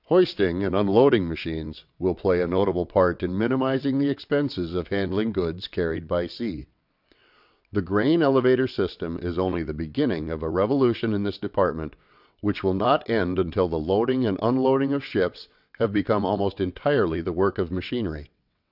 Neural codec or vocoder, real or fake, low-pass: vocoder, 22.05 kHz, 80 mel bands, WaveNeXt; fake; 5.4 kHz